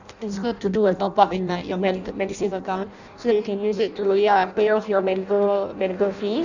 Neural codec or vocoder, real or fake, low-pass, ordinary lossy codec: codec, 16 kHz in and 24 kHz out, 0.6 kbps, FireRedTTS-2 codec; fake; 7.2 kHz; none